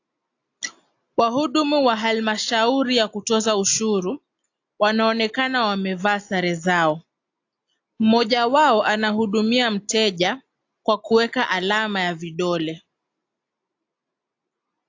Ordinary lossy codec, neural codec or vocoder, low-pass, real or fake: AAC, 48 kbps; none; 7.2 kHz; real